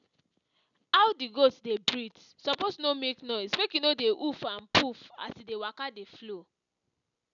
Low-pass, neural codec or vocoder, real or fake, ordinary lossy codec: 7.2 kHz; none; real; none